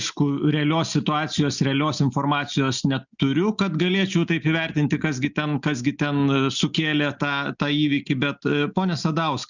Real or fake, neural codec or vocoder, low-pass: real; none; 7.2 kHz